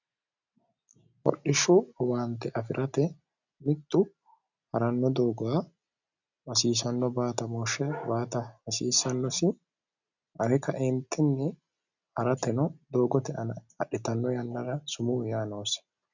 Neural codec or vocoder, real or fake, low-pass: none; real; 7.2 kHz